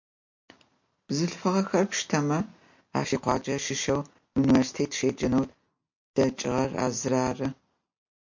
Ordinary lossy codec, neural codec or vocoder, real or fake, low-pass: MP3, 48 kbps; none; real; 7.2 kHz